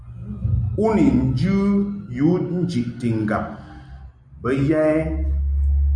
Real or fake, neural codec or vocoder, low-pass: real; none; 9.9 kHz